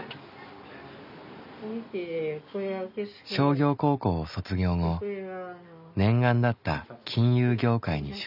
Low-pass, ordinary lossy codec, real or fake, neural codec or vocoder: 5.4 kHz; none; real; none